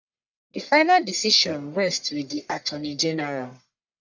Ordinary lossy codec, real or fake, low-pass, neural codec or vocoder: none; fake; 7.2 kHz; codec, 44.1 kHz, 1.7 kbps, Pupu-Codec